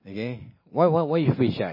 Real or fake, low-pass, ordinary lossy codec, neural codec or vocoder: real; 5.4 kHz; MP3, 24 kbps; none